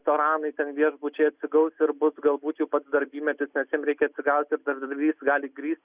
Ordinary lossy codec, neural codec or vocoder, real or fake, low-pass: Opus, 64 kbps; none; real; 3.6 kHz